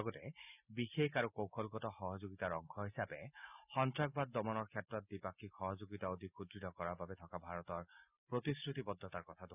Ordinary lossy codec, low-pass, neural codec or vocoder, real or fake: none; 3.6 kHz; none; real